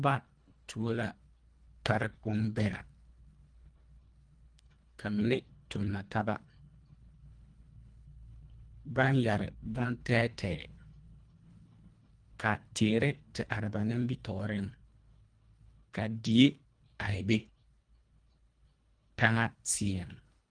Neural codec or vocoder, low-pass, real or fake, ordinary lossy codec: codec, 24 kHz, 1.5 kbps, HILCodec; 9.9 kHz; fake; Opus, 32 kbps